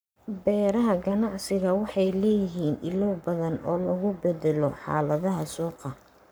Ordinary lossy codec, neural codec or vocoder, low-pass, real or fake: none; codec, 44.1 kHz, 7.8 kbps, Pupu-Codec; none; fake